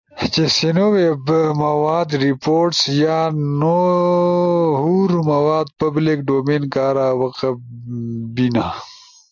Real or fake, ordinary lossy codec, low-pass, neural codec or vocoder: real; AAC, 48 kbps; 7.2 kHz; none